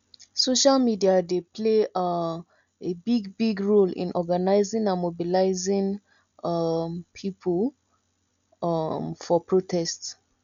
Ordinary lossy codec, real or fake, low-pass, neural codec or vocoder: none; real; 7.2 kHz; none